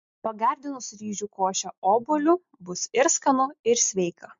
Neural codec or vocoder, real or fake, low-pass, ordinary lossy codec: none; real; 7.2 kHz; MP3, 48 kbps